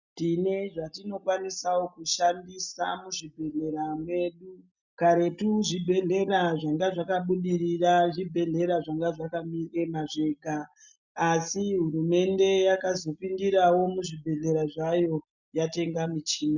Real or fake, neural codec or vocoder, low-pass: real; none; 7.2 kHz